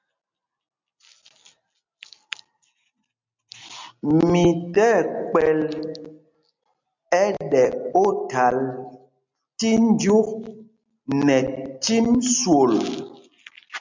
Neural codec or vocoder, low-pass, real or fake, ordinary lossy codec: none; 7.2 kHz; real; MP3, 64 kbps